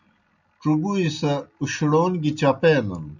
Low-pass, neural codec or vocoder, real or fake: 7.2 kHz; none; real